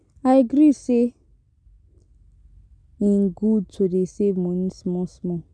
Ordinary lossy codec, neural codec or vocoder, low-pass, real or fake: none; none; 9.9 kHz; real